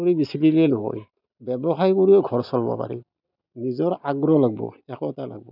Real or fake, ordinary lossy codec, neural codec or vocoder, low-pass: real; none; none; 5.4 kHz